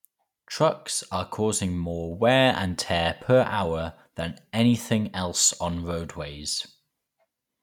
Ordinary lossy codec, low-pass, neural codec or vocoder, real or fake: none; 19.8 kHz; none; real